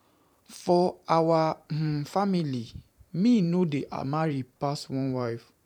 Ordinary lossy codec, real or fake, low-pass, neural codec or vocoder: none; fake; 19.8 kHz; vocoder, 44.1 kHz, 128 mel bands every 512 samples, BigVGAN v2